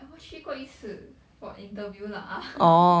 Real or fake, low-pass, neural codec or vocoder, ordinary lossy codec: real; none; none; none